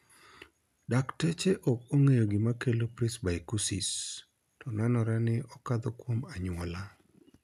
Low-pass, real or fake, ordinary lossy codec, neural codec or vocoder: 14.4 kHz; real; none; none